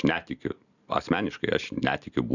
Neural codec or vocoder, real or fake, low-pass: none; real; 7.2 kHz